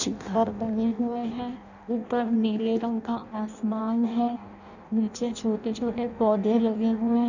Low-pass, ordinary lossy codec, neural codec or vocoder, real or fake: 7.2 kHz; none; codec, 16 kHz in and 24 kHz out, 0.6 kbps, FireRedTTS-2 codec; fake